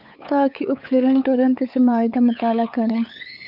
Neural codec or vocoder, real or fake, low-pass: codec, 16 kHz, 8 kbps, FunCodec, trained on LibriTTS, 25 frames a second; fake; 5.4 kHz